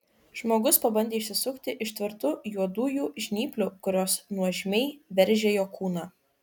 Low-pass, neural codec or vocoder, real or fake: 19.8 kHz; none; real